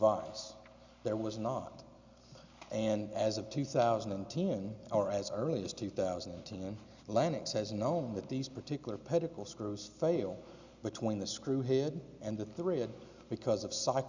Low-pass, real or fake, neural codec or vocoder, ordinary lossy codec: 7.2 kHz; real; none; Opus, 64 kbps